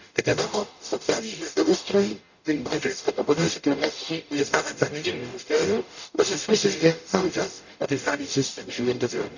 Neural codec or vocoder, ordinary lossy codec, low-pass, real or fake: codec, 44.1 kHz, 0.9 kbps, DAC; none; 7.2 kHz; fake